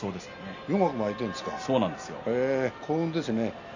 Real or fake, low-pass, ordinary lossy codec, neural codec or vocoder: real; 7.2 kHz; none; none